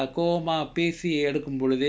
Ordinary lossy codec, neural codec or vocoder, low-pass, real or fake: none; none; none; real